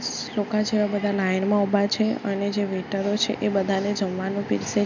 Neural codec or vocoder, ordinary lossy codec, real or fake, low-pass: none; none; real; 7.2 kHz